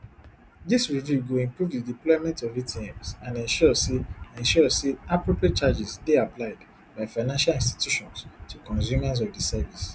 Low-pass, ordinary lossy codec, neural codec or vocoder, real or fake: none; none; none; real